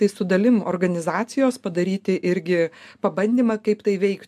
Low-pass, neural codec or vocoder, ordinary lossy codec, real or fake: 14.4 kHz; none; MP3, 96 kbps; real